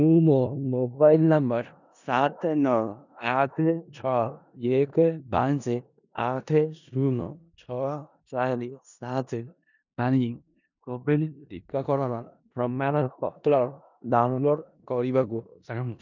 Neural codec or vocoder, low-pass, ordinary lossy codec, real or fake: codec, 16 kHz in and 24 kHz out, 0.4 kbps, LongCat-Audio-Codec, four codebook decoder; 7.2 kHz; none; fake